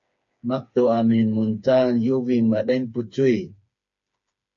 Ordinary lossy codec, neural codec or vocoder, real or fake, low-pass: MP3, 48 kbps; codec, 16 kHz, 4 kbps, FreqCodec, smaller model; fake; 7.2 kHz